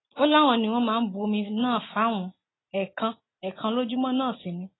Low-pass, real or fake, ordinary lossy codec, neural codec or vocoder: 7.2 kHz; real; AAC, 16 kbps; none